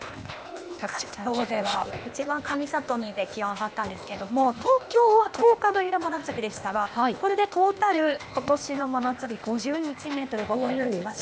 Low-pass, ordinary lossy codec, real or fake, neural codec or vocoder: none; none; fake; codec, 16 kHz, 0.8 kbps, ZipCodec